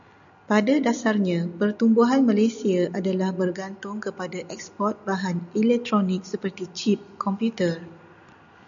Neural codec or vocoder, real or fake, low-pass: none; real; 7.2 kHz